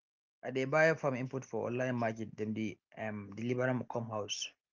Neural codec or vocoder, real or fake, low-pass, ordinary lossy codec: none; real; 7.2 kHz; Opus, 32 kbps